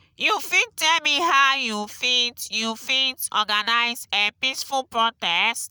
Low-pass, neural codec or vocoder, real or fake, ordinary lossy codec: none; none; real; none